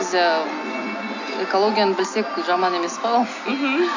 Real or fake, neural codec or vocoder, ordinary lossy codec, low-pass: real; none; AAC, 48 kbps; 7.2 kHz